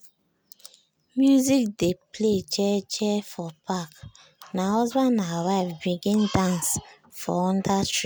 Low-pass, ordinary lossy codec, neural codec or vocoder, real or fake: none; none; none; real